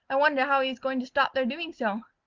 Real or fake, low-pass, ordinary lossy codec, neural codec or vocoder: real; 7.2 kHz; Opus, 32 kbps; none